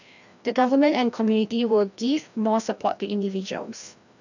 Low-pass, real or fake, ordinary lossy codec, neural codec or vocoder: 7.2 kHz; fake; none; codec, 16 kHz, 1 kbps, FreqCodec, larger model